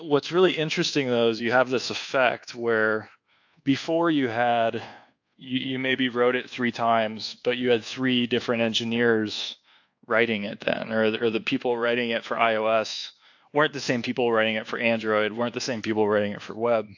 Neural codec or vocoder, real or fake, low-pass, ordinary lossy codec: codec, 24 kHz, 1.2 kbps, DualCodec; fake; 7.2 kHz; AAC, 48 kbps